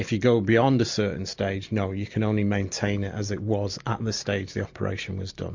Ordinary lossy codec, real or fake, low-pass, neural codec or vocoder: MP3, 48 kbps; real; 7.2 kHz; none